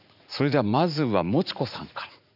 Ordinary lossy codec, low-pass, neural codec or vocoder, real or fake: none; 5.4 kHz; vocoder, 44.1 kHz, 80 mel bands, Vocos; fake